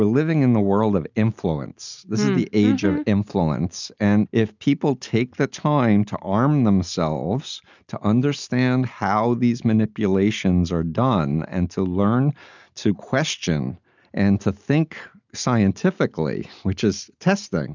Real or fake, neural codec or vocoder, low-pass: real; none; 7.2 kHz